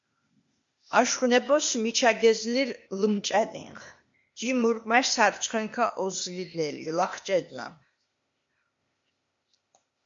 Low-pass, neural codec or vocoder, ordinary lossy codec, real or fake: 7.2 kHz; codec, 16 kHz, 0.8 kbps, ZipCodec; MP3, 48 kbps; fake